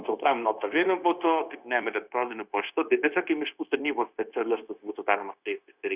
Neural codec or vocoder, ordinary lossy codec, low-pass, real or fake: codec, 16 kHz, 0.9 kbps, LongCat-Audio-Codec; Opus, 64 kbps; 3.6 kHz; fake